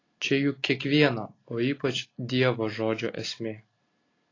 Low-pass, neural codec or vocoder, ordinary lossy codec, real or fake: 7.2 kHz; none; AAC, 32 kbps; real